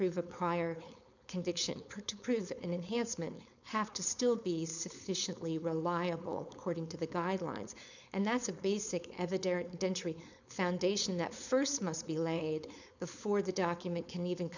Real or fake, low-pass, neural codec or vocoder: fake; 7.2 kHz; codec, 16 kHz, 4.8 kbps, FACodec